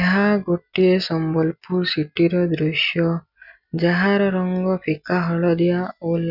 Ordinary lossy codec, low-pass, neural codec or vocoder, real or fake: none; 5.4 kHz; none; real